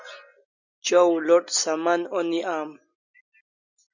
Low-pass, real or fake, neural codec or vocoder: 7.2 kHz; real; none